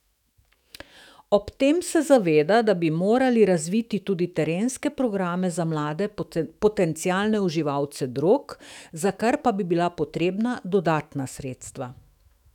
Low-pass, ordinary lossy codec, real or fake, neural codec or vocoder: 19.8 kHz; none; fake; autoencoder, 48 kHz, 128 numbers a frame, DAC-VAE, trained on Japanese speech